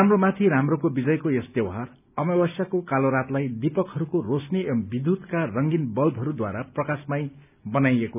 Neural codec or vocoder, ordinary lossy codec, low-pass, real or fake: none; none; 3.6 kHz; real